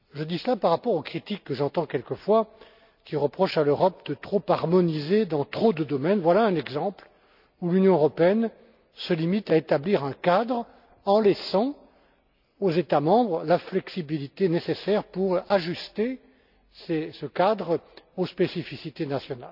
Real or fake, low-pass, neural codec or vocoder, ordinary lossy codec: real; 5.4 kHz; none; none